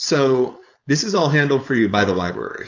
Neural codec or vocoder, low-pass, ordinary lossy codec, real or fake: codec, 16 kHz, 4.8 kbps, FACodec; 7.2 kHz; AAC, 48 kbps; fake